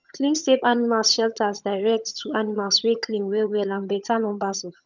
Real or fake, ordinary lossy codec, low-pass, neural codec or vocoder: fake; none; 7.2 kHz; vocoder, 22.05 kHz, 80 mel bands, HiFi-GAN